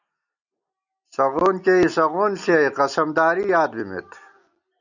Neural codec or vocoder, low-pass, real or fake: none; 7.2 kHz; real